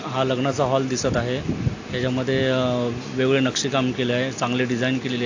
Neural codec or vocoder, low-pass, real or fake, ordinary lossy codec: none; 7.2 kHz; real; AAC, 48 kbps